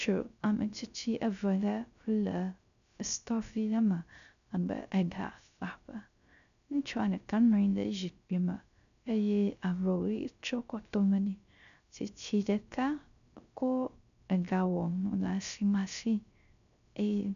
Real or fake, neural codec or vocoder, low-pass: fake; codec, 16 kHz, 0.3 kbps, FocalCodec; 7.2 kHz